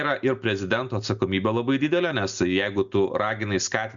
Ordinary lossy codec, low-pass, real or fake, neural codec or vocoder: Opus, 64 kbps; 7.2 kHz; real; none